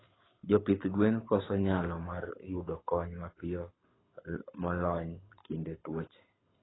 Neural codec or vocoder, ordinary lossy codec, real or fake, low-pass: codec, 24 kHz, 6 kbps, HILCodec; AAC, 16 kbps; fake; 7.2 kHz